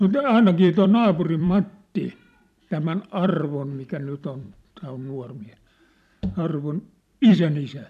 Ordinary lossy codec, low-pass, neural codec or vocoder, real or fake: none; 14.4 kHz; vocoder, 44.1 kHz, 128 mel bands every 256 samples, BigVGAN v2; fake